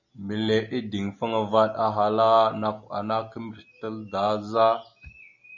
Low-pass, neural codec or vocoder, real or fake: 7.2 kHz; none; real